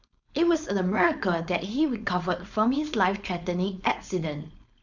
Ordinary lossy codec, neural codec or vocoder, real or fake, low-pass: none; codec, 16 kHz, 4.8 kbps, FACodec; fake; 7.2 kHz